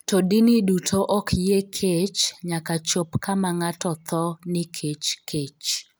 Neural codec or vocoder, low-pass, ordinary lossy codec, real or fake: none; none; none; real